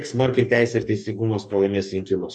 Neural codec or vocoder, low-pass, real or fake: codec, 44.1 kHz, 2.6 kbps, DAC; 9.9 kHz; fake